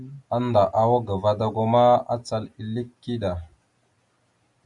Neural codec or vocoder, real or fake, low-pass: none; real; 10.8 kHz